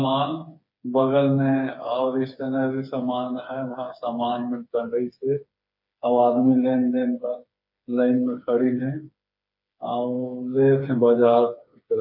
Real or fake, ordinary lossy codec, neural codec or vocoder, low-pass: fake; MP3, 32 kbps; codec, 16 kHz, 4 kbps, FreqCodec, smaller model; 5.4 kHz